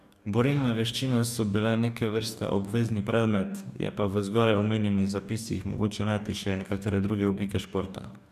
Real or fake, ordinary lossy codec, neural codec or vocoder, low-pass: fake; none; codec, 44.1 kHz, 2.6 kbps, DAC; 14.4 kHz